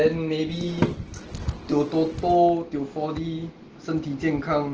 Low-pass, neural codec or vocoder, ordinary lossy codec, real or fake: 7.2 kHz; none; Opus, 16 kbps; real